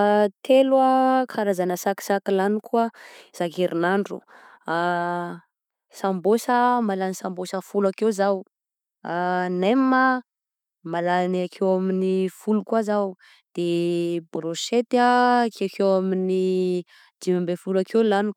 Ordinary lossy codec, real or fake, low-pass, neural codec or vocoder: none; real; 19.8 kHz; none